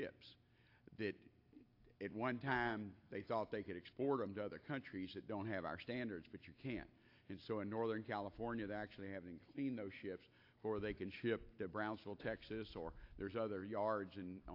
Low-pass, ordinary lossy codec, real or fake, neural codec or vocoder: 5.4 kHz; AAC, 32 kbps; real; none